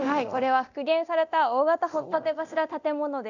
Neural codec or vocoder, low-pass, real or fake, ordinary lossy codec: codec, 24 kHz, 0.9 kbps, DualCodec; 7.2 kHz; fake; none